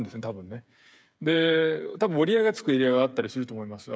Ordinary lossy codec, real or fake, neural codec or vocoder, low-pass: none; fake; codec, 16 kHz, 8 kbps, FreqCodec, smaller model; none